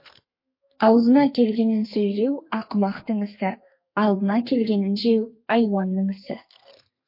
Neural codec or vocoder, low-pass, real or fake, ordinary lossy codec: codec, 32 kHz, 1.9 kbps, SNAC; 5.4 kHz; fake; MP3, 32 kbps